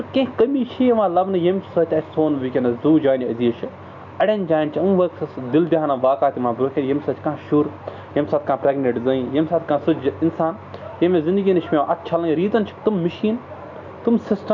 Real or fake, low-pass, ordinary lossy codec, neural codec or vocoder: real; 7.2 kHz; none; none